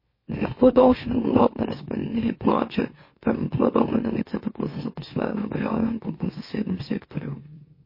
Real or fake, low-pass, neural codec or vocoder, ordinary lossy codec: fake; 5.4 kHz; autoencoder, 44.1 kHz, a latent of 192 numbers a frame, MeloTTS; MP3, 24 kbps